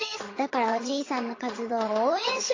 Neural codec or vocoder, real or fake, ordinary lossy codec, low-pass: vocoder, 22.05 kHz, 80 mel bands, HiFi-GAN; fake; AAC, 32 kbps; 7.2 kHz